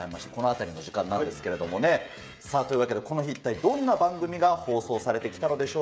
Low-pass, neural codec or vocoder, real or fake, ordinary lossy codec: none; codec, 16 kHz, 16 kbps, FreqCodec, smaller model; fake; none